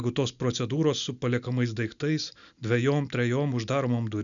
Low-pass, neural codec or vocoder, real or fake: 7.2 kHz; none; real